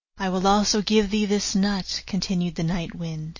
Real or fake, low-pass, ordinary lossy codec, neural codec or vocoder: real; 7.2 kHz; MP3, 32 kbps; none